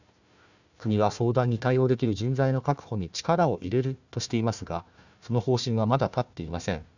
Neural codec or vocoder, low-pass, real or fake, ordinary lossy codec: codec, 16 kHz, 1 kbps, FunCodec, trained on Chinese and English, 50 frames a second; 7.2 kHz; fake; none